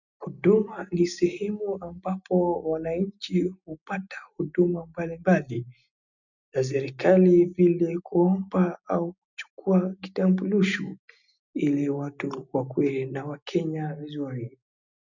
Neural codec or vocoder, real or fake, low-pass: none; real; 7.2 kHz